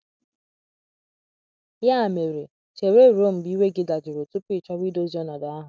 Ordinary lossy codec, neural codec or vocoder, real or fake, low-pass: none; none; real; none